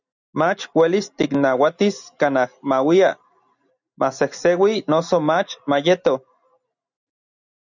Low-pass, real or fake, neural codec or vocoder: 7.2 kHz; real; none